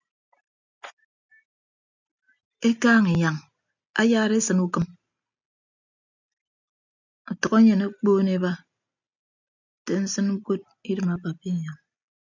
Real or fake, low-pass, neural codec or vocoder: real; 7.2 kHz; none